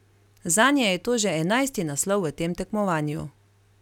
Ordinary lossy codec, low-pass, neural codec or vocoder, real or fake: none; 19.8 kHz; none; real